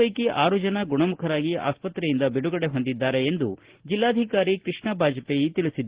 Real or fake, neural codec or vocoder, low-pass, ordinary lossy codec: real; none; 3.6 kHz; Opus, 16 kbps